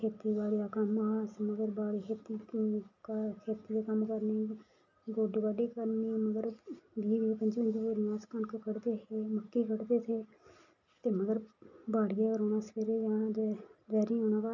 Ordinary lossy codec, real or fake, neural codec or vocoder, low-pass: none; real; none; 7.2 kHz